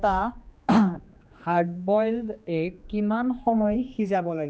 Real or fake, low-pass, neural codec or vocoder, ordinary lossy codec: fake; none; codec, 16 kHz, 2 kbps, X-Codec, HuBERT features, trained on general audio; none